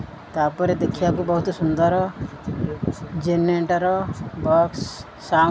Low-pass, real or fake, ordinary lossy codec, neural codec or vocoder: none; real; none; none